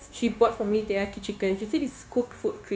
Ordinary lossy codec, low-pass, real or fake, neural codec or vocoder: none; none; fake; codec, 16 kHz, 0.9 kbps, LongCat-Audio-Codec